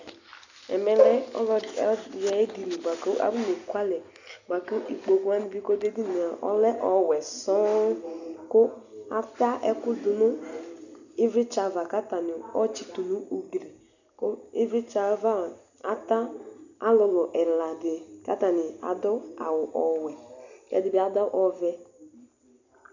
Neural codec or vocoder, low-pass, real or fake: none; 7.2 kHz; real